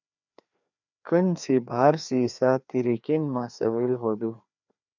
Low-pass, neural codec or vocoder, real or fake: 7.2 kHz; codec, 16 kHz, 2 kbps, FreqCodec, larger model; fake